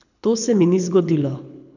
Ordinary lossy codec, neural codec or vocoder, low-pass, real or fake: none; codec, 24 kHz, 6 kbps, HILCodec; 7.2 kHz; fake